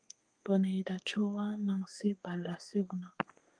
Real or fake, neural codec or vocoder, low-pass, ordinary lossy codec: fake; codec, 32 kHz, 1.9 kbps, SNAC; 9.9 kHz; Opus, 32 kbps